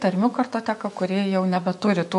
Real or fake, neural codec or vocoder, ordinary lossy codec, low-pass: fake; autoencoder, 48 kHz, 128 numbers a frame, DAC-VAE, trained on Japanese speech; MP3, 48 kbps; 14.4 kHz